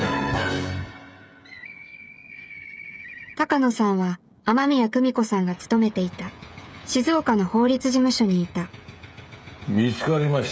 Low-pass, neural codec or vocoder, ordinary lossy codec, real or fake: none; codec, 16 kHz, 16 kbps, FreqCodec, smaller model; none; fake